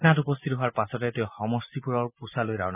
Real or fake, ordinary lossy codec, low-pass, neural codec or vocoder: real; none; 3.6 kHz; none